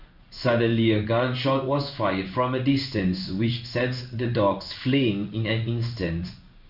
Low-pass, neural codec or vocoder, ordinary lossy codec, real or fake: 5.4 kHz; codec, 16 kHz in and 24 kHz out, 1 kbps, XY-Tokenizer; none; fake